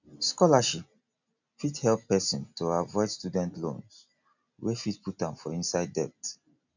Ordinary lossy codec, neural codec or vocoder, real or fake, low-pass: none; none; real; 7.2 kHz